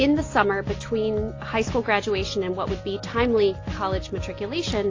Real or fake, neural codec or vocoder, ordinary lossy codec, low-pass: real; none; AAC, 32 kbps; 7.2 kHz